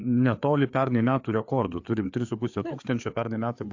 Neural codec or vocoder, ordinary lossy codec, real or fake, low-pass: codec, 16 kHz, 4 kbps, FreqCodec, larger model; AAC, 48 kbps; fake; 7.2 kHz